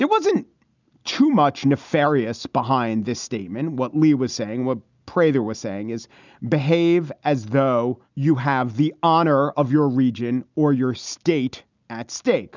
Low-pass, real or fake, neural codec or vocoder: 7.2 kHz; real; none